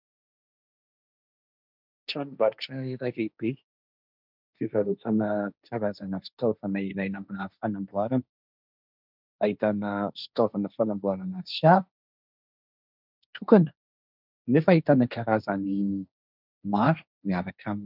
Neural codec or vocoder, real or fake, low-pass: codec, 16 kHz, 1.1 kbps, Voila-Tokenizer; fake; 5.4 kHz